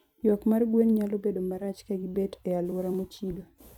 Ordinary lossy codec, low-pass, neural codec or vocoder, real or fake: none; 19.8 kHz; vocoder, 44.1 kHz, 128 mel bands every 256 samples, BigVGAN v2; fake